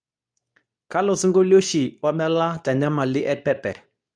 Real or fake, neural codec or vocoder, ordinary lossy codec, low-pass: fake; codec, 24 kHz, 0.9 kbps, WavTokenizer, medium speech release version 2; none; 9.9 kHz